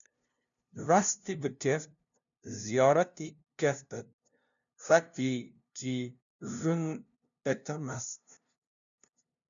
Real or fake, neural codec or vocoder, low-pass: fake; codec, 16 kHz, 0.5 kbps, FunCodec, trained on LibriTTS, 25 frames a second; 7.2 kHz